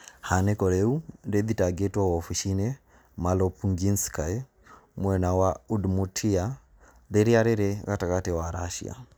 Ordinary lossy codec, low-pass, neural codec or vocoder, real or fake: none; none; none; real